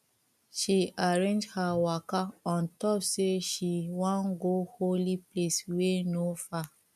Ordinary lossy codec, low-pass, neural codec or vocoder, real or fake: none; 14.4 kHz; none; real